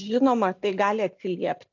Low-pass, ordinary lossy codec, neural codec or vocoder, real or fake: 7.2 kHz; AAC, 48 kbps; none; real